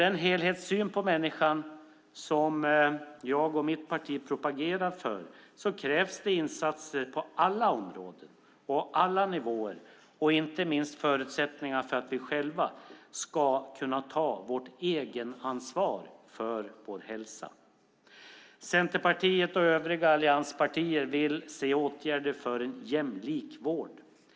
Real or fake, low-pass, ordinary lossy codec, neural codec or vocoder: real; none; none; none